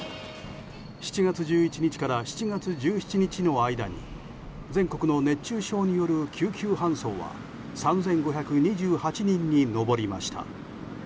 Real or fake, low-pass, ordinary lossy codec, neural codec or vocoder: real; none; none; none